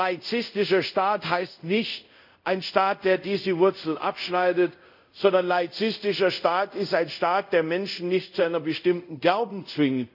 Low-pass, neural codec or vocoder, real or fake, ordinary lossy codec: 5.4 kHz; codec, 24 kHz, 0.5 kbps, DualCodec; fake; none